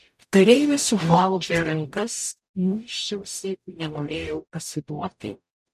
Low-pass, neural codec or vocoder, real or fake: 14.4 kHz; codec, 44.1 kHz, 0.9 kbps, DAC; fake